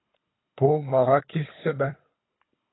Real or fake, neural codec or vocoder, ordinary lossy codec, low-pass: fake; codec, 24 kHz, 3 kbps, HILCodec; AAC, 16 kbps; 7.2 kHz